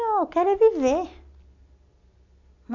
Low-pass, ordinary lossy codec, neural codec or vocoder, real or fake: 7.2 kHz; none; none; real